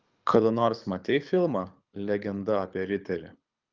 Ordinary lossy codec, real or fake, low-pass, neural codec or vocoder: Opus, 24 kbps; fake; 7.2 kHz; codec, 24 kHz, 6 kbps, HILCodec